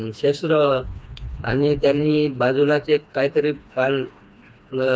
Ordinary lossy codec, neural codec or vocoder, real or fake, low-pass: none; codec, 16 kHz, 2 kbps, FreqCodec, smaller model; fake; none